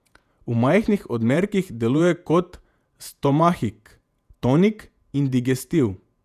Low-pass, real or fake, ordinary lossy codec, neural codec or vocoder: 14.4 kHz; fake; none; vocoder, 48 kHz, 128 mel bands, Vocos